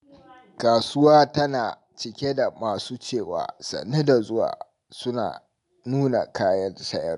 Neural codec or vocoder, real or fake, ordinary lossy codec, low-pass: none; real; none; 10.8 kHz